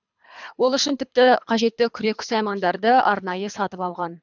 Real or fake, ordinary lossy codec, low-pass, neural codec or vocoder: fake; none; 7.2 kHz; codec, 24 kHz, 3 kbps, HILCodec